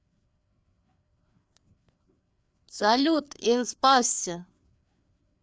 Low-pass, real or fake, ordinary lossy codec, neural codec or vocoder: none; fake; none; codec, 16 kHz, 4 kbps, FreqCodec, larger model